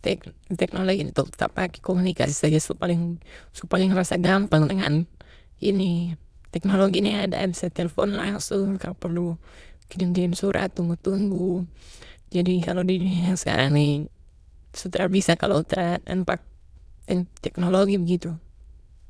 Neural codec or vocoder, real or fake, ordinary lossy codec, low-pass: autoencoder, 22.05 kHz, a latent of 192 numbers a frame, VITS, trained on many speakers; fake; none; none